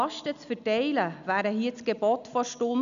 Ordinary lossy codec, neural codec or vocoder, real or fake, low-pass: none; none; real; 7.2 kHz